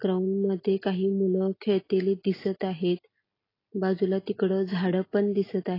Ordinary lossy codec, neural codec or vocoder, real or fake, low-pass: AAC, 24 kbps; none; real; 5.4 kHz